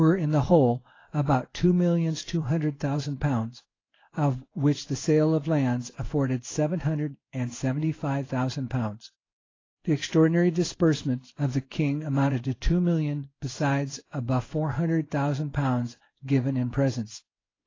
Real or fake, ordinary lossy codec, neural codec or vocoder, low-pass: fake; AAC, 32 kbps; codec, 16 kHz in and 24 kHz out, 1 kbps, XY-Tokenizer; 7.2 kHz